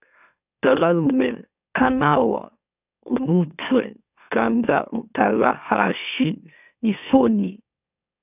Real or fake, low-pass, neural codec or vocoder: fake; 3.6 kHz; autoencoder, 44.1 kHz, a latent of 192 numbers a frame, MeloTTS